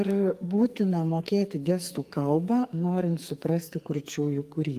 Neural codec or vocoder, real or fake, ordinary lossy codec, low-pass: codec, 44.1 kHz, 2.6 kbps, SNAC; fake; Opus, 32 kbps; 14.4 kHz